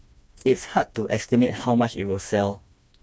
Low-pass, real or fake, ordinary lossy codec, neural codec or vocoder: none; fake; none; codec, 16 kHz, 2 kbps, FreqCodec, smaller model